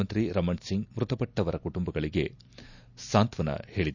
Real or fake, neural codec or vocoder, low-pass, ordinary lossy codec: real; none; 7.2 kHz; none